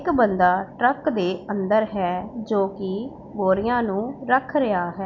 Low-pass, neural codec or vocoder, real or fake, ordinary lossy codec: 7.2 kHz; none; real; none